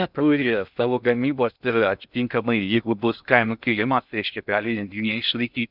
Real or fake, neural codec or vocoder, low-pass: fake; codec, 16 kHz in and 24 kHz out, 0.6 kbps, FocalCodec, streaming, 2048 codes; 5.4 kHz